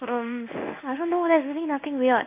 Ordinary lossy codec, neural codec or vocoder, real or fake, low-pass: none; codec, 16 kHz in and 24 kHz out, 1 kbps, XY-Tokenizer; fake; 3.6 kHz